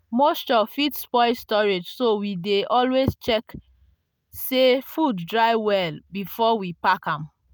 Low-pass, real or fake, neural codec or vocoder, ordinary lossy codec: none; real; none; none